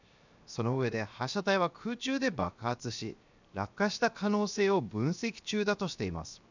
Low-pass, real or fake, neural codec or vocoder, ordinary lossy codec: 7.2 kHz; fake; codec, 16 kHz, 0.7 kbps, FocalCodec; none